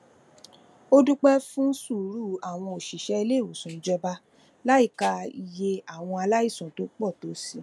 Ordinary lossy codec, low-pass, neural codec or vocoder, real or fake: none; none; none; real